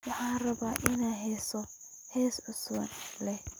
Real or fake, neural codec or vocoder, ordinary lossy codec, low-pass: real; none; none; none